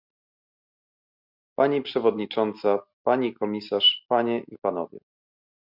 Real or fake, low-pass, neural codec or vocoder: real; 5.4 kHz; none